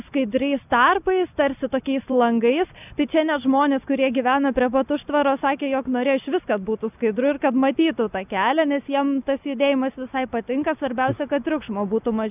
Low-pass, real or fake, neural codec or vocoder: 3.6 kHz; real; none